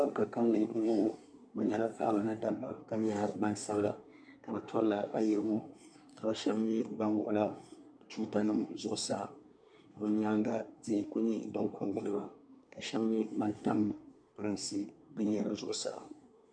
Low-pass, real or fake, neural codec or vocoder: 9.9 kHz; fake; codec, 24 kHz, 1 kbps, SNAC